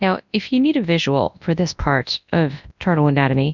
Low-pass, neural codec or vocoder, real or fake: 7.2 kHz; codec, 24 kHz, 0.9 kbps, WavTokenizer, large speech release; fake